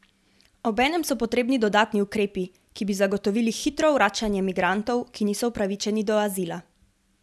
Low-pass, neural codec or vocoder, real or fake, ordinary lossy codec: none; none; real; none